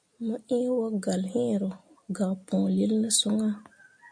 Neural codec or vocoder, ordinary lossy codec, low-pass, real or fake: none; MP3, 48 kbps; 9.9 kHz; real